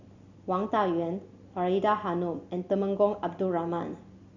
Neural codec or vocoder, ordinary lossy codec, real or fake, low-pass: none; Opus, 64 kbps; real; 7.2 kHz